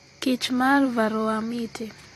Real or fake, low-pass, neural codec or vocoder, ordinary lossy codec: fake; 14.4 kHz; vocoder, 44.1 kHz, 128 mel bands every 256 samples, BigVGAN v2; AAC, 48 kbps